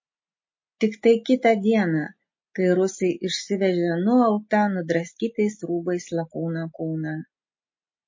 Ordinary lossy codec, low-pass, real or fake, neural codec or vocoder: MP3, 32 kbps; 7.2 kHz; real; none